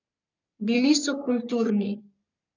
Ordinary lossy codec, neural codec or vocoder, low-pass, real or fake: none; codec, 44.1 kHz, 3.4 kbps, Pupu-Codec; 7.2 kHz; fake